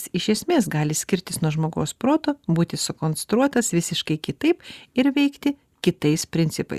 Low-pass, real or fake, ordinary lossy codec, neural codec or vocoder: 14.4 kHz; real; Opus, 64 kbps; none